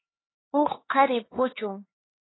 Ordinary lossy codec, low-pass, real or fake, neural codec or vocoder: AAC, 16 kbps; 7.2 kHz; fake; codec, 16 kHz, 4 kbps, X-Codec, HuBERT features, trained on LibriSpeech